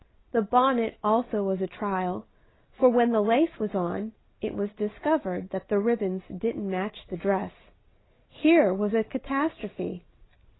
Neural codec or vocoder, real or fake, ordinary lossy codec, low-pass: none; real; AAC, 16 kbps; 7.2 kHz